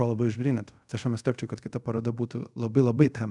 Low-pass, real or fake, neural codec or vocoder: 10.8 kHz; fake; codec, 24 kHz, 0.5 kbps, DualCodec